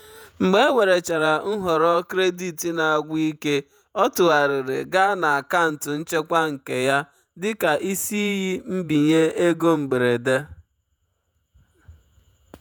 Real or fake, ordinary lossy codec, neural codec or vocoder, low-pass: fake; none; vocoder, 48 kHz, 128 mel bands, Vocos; none